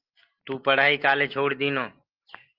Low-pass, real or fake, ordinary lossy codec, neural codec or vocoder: 5.4 kHz; real; Opus, 24 kbps; none